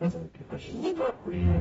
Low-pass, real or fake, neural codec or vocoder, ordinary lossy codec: 19.8 kHz; fake; codec, 44.1 kHz, 0.9 kbps, DAC; AAC, 24 kbps